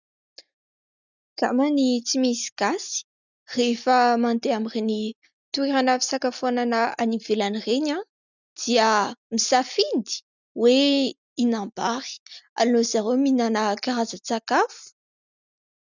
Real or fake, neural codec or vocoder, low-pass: real; none; 7.2 kHz